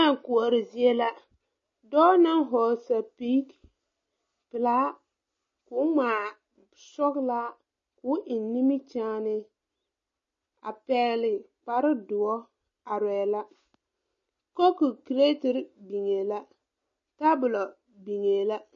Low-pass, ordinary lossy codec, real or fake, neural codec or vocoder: 7.2 kHz; MP3, 32 kbps; real; none